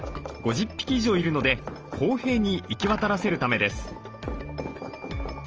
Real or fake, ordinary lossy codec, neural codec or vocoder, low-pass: real; Opus, 24 kbps; none; 7.2 kHz